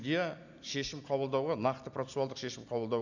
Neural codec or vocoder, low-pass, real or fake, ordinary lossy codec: none; 7.2 kHz; real; none